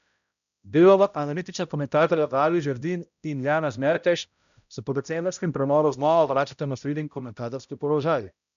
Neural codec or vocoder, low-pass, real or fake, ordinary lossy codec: codec, 16 kHz, 0.5 kbps, X-Codec, HuBERT features, trained on balanced general audio; 7.2 kHz; fake; none